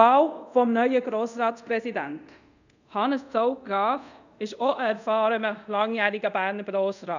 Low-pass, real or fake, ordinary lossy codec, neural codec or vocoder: 7.2 kHz; fake; none; codec, 24 kHz, 0.5 kbps, DualCodec